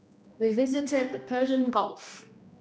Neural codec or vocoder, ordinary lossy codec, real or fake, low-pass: codec, 16 kHz, 1 kbps, X-Codec, HuBERT features, trained on general audio; none; fake; none